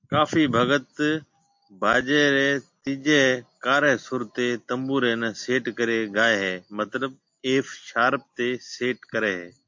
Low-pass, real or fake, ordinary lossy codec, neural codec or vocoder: 7.2 kHz; real; MP3, 48 kbps; none